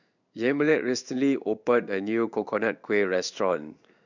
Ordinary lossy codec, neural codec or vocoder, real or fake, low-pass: none; codec, 16 kHz in and 24 kHz out, 1 kbps, XY-Tokenizer; fake; 7.2 kHz